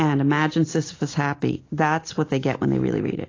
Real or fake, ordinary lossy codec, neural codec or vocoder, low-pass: real; AAC, 32 kbps; none; 7.2 kHz